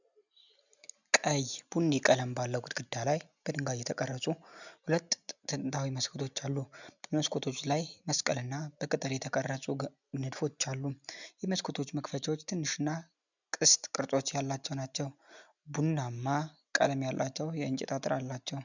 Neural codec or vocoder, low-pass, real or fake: none; 7.2 kHz; real